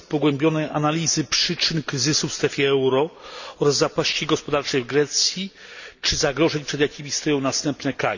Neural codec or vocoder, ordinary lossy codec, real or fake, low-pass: none; none; real; 7.2 kHz